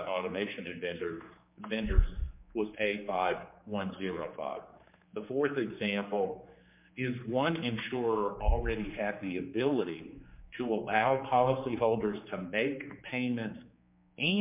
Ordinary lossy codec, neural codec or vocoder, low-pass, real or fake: MP3, 32 kbps; codec, 16 kHz, 4 kbps, X-Codec, HuBERT features, trained on general audio; 3.6 kHz; fake